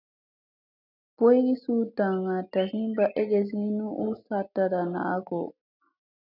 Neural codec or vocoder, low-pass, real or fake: none; 5.4 kHz; real